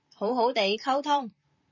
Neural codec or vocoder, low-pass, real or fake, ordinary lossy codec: vocoder, 44.1 kHz, 128 mel bands, Pupu-Vocoder; 7.2 kHz; fake; MP3, 32 kbps